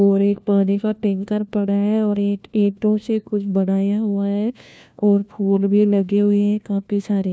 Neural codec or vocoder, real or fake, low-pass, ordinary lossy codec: codec, 16 kHz, 1 kbps, FunCodec, trained on LibriTTS, 50 frames a second; fake; none; none